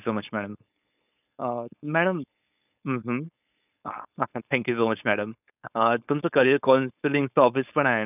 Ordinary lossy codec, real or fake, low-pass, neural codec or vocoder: none; fake; 3.6 kHz; codec, 16 kHz, 4.8 kbps, FACodec